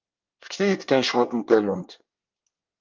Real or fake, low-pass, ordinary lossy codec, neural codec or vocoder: fake; 7.2 kHz; Opus, 32 kbps; codec, 24 kHz, 1 kbps, SNAC